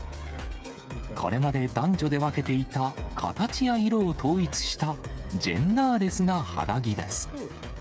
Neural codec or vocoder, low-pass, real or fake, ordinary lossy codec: codec, 16 kHz, 8 kbps, FreqCodec, smaller model; none; fake; none